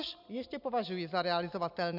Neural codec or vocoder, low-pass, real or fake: none; 5.4 kHz; real